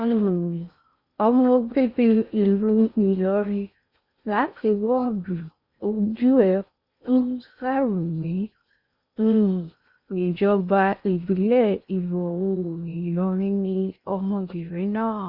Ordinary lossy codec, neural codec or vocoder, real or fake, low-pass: Opus, 64 kbps; codec, 16 kHz in and 24 kHz out, 0.6 kbps, FocalCodec, streaming, 4096 codes; fake; 5.4 kHz